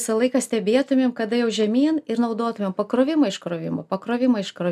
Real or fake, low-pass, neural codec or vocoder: real; 14.4 kHz; none